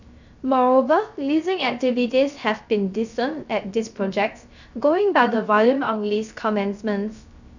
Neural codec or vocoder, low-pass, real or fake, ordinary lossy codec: codec, 16 kHz, 0.3 kbps, FocalCodec; 7.2 kHz; fake; none